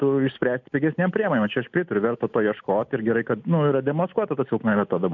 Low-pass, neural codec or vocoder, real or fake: 7.2 kHz; none; real